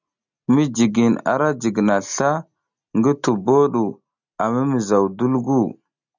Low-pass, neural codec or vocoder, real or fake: 7.2 kHz; none; real